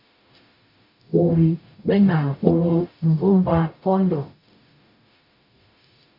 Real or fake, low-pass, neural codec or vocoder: fake; 5.4 kHz; codec, 44.1 kHz, 0.9 kbps, DAC